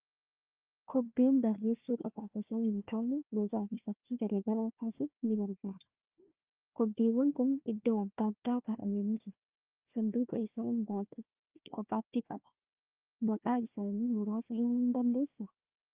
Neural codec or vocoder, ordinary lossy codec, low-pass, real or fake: codec, 16 kHz, 1 kbps, FunCodec, trained on Chinese and English, 50 frames a second; Opus, 24 kbps; 3.6 kHz; fake